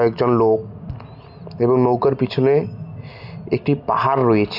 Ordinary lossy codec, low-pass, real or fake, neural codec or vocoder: none; 5.4 kHz; real; none